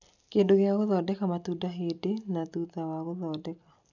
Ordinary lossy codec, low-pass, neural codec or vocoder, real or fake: none; 7.2 kHz; none; real